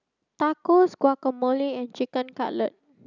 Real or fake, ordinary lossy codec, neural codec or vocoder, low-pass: real; none; none; 7.2 kHz